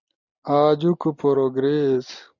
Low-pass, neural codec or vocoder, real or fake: 7.2 kHz; none; real